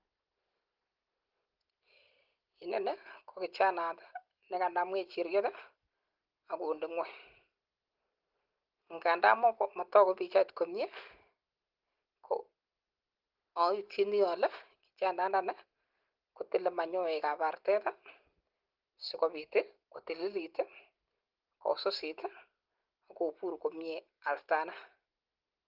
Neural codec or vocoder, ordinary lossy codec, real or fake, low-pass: none; Opus, 16 kbps; real; 5.4 kHz